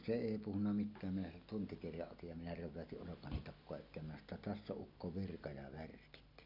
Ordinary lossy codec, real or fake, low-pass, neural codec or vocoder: none; real; 5.4 kHz; none